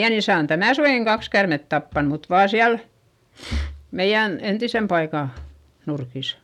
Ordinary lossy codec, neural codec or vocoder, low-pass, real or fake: none; none; 19.8 kHz; real